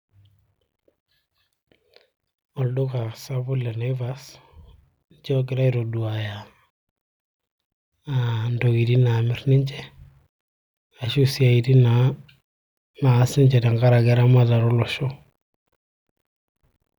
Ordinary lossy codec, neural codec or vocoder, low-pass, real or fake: none; none; 19.8 kHz; real